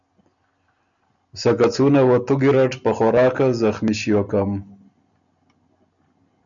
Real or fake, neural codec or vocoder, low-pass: real; none; 7.2 kHz